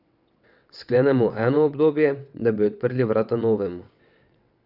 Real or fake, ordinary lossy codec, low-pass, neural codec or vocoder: fake; none; 5.4 kHz; vocoder, 22.05 kHz, 80 mel bands, WaveNeXt